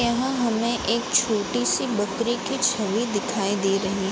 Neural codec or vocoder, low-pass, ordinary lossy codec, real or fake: none; none; none; real